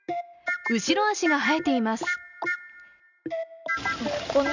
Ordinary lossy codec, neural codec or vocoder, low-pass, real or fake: none; none; 7.2 kHz; real